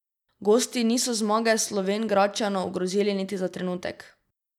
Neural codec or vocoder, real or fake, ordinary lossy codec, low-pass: none; real; none; 19.8 kHz